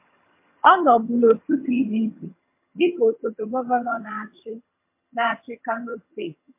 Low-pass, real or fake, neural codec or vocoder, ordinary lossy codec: 3.6 kHz; fake; vocoder, 22.05 kHz, 80 mel bands, HiFi-GAN; MP3, 24 kbps